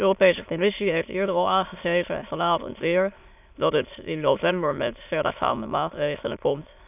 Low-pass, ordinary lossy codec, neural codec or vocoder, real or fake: 3.6 kHz; none; autoencoder, 22.05 kHz, a latent of 192 numbers a frame, VITS, trained on many speakers; fake